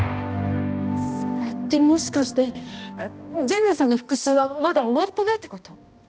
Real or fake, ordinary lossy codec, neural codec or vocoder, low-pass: fake; none; codec, 16 kHz, 1 kbps, X-Codec, HuBERT features, trained on balanced general audio; none